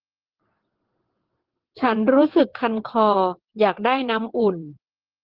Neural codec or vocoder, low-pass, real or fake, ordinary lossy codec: vocoder, 22.05 kHz, 80 mel bands, WaveNeXt; 5.4 kHz; fake; Opus, 16 kbps